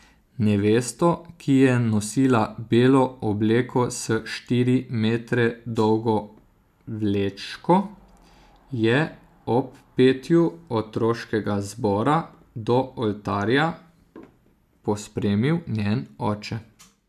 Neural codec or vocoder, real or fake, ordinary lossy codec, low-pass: none; real; none; 14.4 kHz